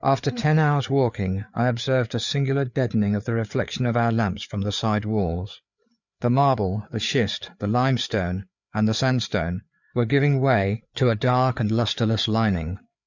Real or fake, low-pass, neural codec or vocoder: fake; 7.2 kHz; codec, 16 kHz, 4 kbps, FreqCodec, larger model